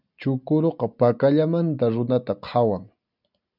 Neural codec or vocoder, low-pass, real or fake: none; 5.4 kHz; real